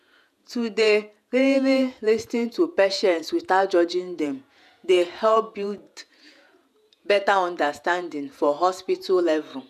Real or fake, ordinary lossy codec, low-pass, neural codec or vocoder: fake; none; 14.4 kHz; vocoder, 48 kHz, 128 mel bands, Vocos